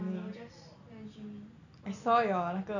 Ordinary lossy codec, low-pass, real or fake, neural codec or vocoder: none; 7.2 kHz; real; none